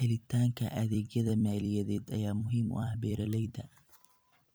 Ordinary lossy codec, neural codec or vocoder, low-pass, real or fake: none; vocoder, 44.1 kHz, 128 mel bands every 256 samples, BigVGAN v2; none; fake